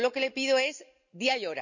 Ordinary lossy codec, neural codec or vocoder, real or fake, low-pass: none; none; real; 7.2 kHz